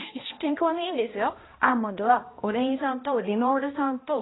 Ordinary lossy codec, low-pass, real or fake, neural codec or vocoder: AAC, 16 kbps; 7.2 kHz; fake; codec, 16 kHz, 1 kbps, X-Codec, HuBERT features, trained on balanced general audio